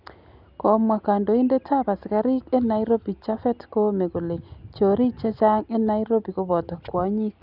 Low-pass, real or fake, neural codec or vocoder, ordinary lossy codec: 5.4 kHz; real; none; none